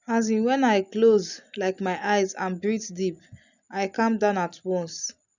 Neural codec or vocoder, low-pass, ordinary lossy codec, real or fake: none; 7.2 kHz; none; real